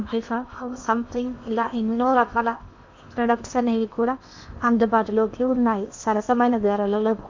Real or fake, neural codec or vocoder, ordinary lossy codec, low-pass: fake; codec, 16 kHz in and 24 kHz out, 0.8 kbps, FocalCodec, streaming, 65536 codes; MP3, 64 kbps; 7.2 kHz